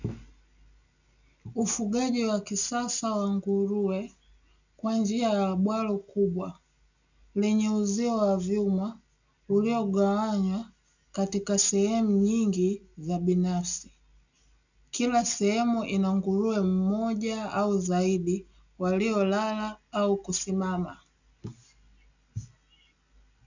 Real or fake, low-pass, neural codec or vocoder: real; 7.2 kHz; none